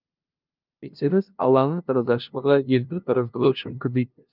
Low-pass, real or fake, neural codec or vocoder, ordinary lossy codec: 5.4 kHz; fake; codec, 16 kHz, 0.5 kbps, FunCodec, trained on LibriTTS, 25 frames a second; Opus, 24 kbps